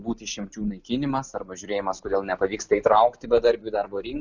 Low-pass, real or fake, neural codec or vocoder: 7.2 kHz; real; none